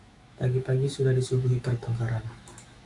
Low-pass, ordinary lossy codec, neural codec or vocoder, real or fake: 10.8 kHz; AAC, 64 kbps; autoencoder, 48 kHz, 128 numbers a frame, DAC-VAE, trained on Japanese speech; fake